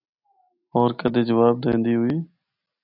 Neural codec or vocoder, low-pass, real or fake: none; 5.4 kHz; real